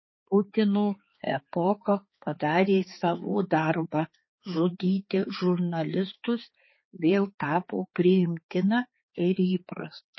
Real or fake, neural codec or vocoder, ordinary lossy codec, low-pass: fake; codec, 16 kHz, 4 kbps, X-Codec, HuBERT features, trained on balanced general audio; MP3, 24 kbps; 7.2 kHz